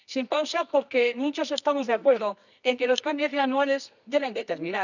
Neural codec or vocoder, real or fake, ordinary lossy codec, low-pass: codec, 24 kHz, 0.9 kbps, WavTokenizer, medium music audio release; fake; none; 7.2 kHz